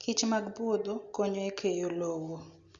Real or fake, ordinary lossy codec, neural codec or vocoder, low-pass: real; Opus, 64 kbps; none; 7.2 kHz